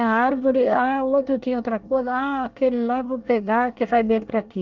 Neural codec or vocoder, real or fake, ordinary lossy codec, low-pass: codec, 24 kHz, 1 kbps, SNAC; fake; Opus, 32 kbps; 7.2 kHz